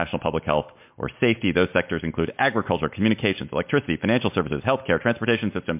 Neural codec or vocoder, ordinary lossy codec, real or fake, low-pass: none; MP3, 32 kbps; real; 3.6 kHz